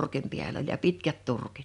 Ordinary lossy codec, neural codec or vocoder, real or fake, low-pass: none; none; real; 10.8 kHz